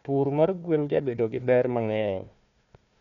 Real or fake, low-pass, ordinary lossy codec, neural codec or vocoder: fake; 7.2 kHz; none; codec, 16 kHz, 1 kbps, FunCodec, trained on Chinese and English, 50 frames a second